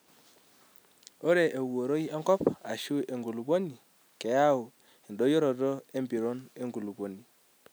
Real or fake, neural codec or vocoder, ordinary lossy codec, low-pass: real; none; none; none